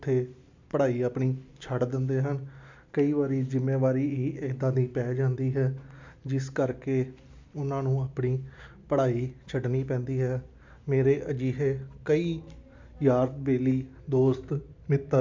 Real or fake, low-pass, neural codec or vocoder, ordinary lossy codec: real; 7.2 kHz; none; MP3, 64 kbps